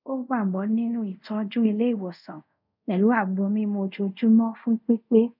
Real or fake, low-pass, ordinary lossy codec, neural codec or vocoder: fake; 5.4 kHz; none; codec, 24 kHz, 0.5 kbps, DualCodec